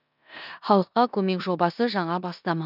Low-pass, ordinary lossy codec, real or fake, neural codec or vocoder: 5.4 kHz; none; fake; codec, 16 kHz in and 24 kHz out, 0.9 kbps, LongCat-Audio-Codec, four codebook decoder